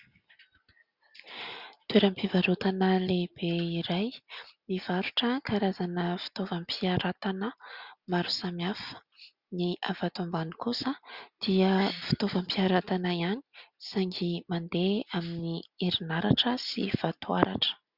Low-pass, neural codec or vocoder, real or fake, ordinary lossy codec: 5.4 kHz; none; real; Opus, 64 kbps